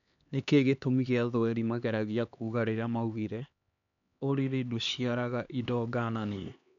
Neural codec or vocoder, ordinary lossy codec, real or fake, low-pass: codec, 16 kHz, 2 kbps, X-Codec, HuBERT features, trained on LibriSpeech; MP3, 96 kbps; fake; 7.2 kHz